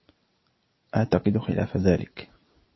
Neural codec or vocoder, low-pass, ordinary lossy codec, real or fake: none; 7.2 kHz; MP3, 24 kbps; real